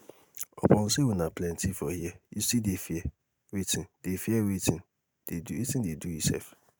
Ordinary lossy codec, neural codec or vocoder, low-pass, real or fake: none; none; none; real